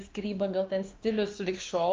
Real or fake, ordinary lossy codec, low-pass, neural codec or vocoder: fake; Opus, 32 kbps; 7.2 kHz; codec, 16 kHz, 2 kbps, X-Codec, WavLM features, trained on Multilingual LibriSpeech